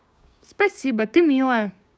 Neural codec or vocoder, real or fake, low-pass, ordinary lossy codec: codec, 16 kHz, 6 kbps, DAC; fake; none; none